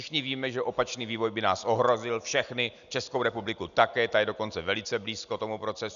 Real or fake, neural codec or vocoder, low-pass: real; none; 7.2 kHz